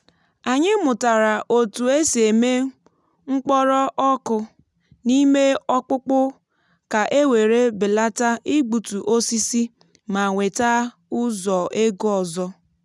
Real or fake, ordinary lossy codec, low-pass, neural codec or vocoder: real; none; none; none